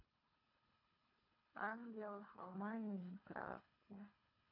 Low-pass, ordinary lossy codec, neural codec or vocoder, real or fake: 5.4 kHz; none; codec, 24 kHz, 3 kbps, HILCodec; fake